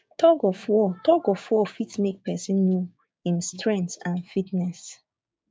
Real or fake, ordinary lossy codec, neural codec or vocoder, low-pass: fake; none; codec, 16 kHz, 6 kbps, DAC; none